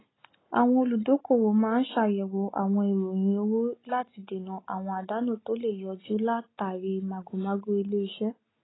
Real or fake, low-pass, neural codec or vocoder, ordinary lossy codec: real; 7.2 kHz; none; AAC, 16 kbps